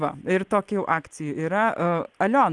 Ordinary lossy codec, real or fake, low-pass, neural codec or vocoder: Opus, 24 kbps; real; 10.8 kHz; none